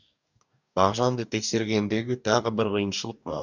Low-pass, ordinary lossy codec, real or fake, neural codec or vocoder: 7.2 kHz; none; fake; codec, 44.1 kHz, 2.6 kbps, DAC